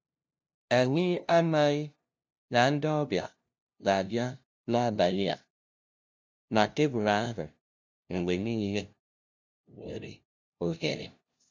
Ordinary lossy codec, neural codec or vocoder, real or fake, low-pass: none; codec, 16 kHz, 0.5 kbps, FunCodec, trained on LibriTTS, 25 frames a second; fake; none